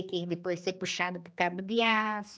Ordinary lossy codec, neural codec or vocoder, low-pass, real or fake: none; codec, 16 kHz, 2 kbps, X-Codec, HuBERT features, trained on general audio; none; fake